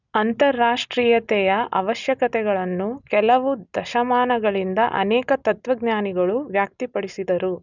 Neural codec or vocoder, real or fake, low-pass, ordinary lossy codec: none; real; 7.2 kHz; none